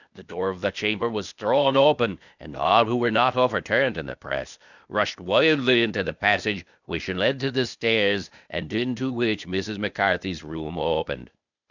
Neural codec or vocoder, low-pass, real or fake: codec, 16 kHz, 0.8 kbps, ZipCodec; 7.2 kHz; fake